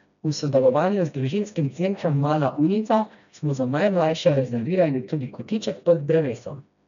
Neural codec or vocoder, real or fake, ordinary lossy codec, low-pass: codec, 16 kHz, 1 kbps, FreqCodec, smaller model; fake; none; 7.2 kHz